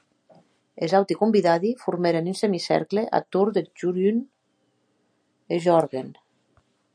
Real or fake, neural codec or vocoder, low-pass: real; none; 9.9 kHz